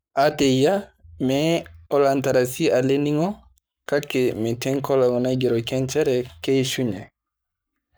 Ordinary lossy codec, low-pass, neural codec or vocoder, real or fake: none; none; codec, 44.1 kHz, 7.8 kbps, Pupu-Codec; fake